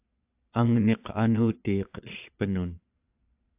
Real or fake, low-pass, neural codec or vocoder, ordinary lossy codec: fake; 3.6 kHz; vocoder, 22.05 kHz, 80 mel bands, Vocos; AAC, 32 kbps